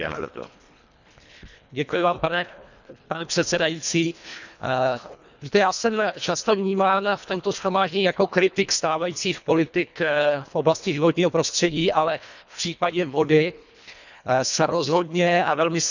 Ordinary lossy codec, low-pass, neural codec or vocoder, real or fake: none; 7.2 kHz; codec, 24 kHz, 1.5 kbps, HILCodec; fake